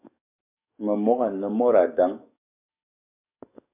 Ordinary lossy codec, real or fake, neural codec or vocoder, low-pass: MP3, 32 kbps; fake; codec, 44.1 kHz, 7.8 kbps, DAC; 3.6 kHz